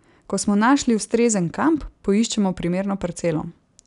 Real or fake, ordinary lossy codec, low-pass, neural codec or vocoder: real; none; 10.8 kHz; none